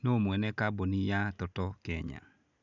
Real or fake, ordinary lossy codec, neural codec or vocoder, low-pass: real; none; none; 7.2 kHz